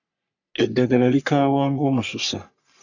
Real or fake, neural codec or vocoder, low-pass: fake; codec, 44.1 kHz, 3.4 kbps, Pupu-Codec; 7.2 kHz